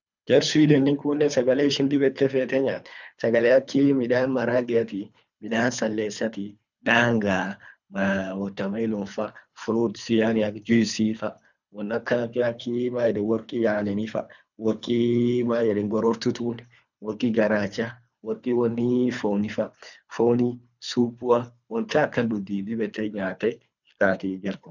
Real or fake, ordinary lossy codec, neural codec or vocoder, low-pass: fake; none; codec, 24 kHz, 3 kbps, HILCodec; 7.2 kHz